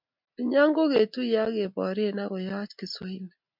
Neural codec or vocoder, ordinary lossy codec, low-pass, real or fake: none; MP3, 48 kbps; 5.4 kHz; real